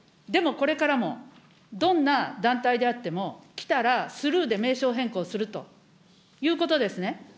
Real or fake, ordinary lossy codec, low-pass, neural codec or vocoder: real; none; none; none